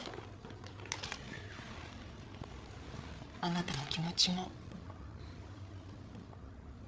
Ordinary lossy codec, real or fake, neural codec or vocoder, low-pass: none; fake; codec, 16 kHz, 8 kbps, FreqCodec, larger model; none